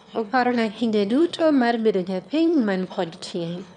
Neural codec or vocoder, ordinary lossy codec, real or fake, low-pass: autoencoder, 22.05 kHz, a latent of 192 numbers a frame, VITS, trained on one speaker; none; fake; 9.9 kHz